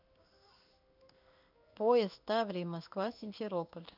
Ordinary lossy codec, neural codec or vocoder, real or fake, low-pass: none; codec, 16 kHz in and 24 kHz out, 1 kbps, XY-Tokenizer; fake; 5.4 kHz